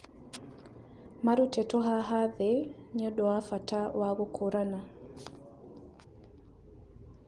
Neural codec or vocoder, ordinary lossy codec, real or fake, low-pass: none; Opus, 24 kbps; real; 10.8 kHz